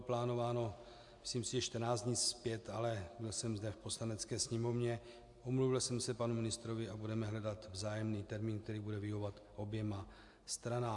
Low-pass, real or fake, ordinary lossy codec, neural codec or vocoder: 10.8 kHz; real; AAC, 64 kbps; none